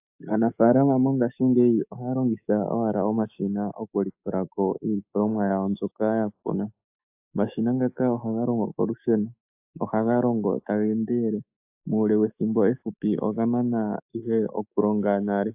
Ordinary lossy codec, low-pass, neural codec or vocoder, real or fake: AAC, 32 kbps; 3.6 kHz; autoencoder, 48 kHz, 128 numbers a frame, DAC-VAE, trained on Japanese speech; fake